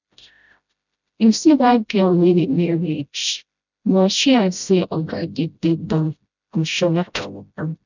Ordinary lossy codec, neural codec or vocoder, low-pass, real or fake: none; codec, 16 kHz, 0.5 kbps, FreqCodec, smaller model; 7.2 kHz; fake